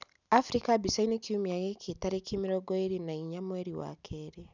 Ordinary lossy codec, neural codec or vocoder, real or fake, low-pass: none; none; real; 7.2 kHz